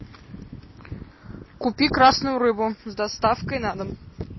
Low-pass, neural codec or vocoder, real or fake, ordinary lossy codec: 7.2 kHz; none; real; MP3, 24 kbps